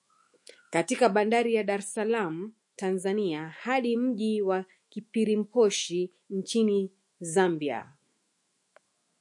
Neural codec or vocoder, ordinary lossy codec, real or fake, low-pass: autoencoder, 48 kHz, 128 numbers a frame, DAC-VAE, trained on Japanese speech; MP3, 48 kbps; fake; 10.8 kHz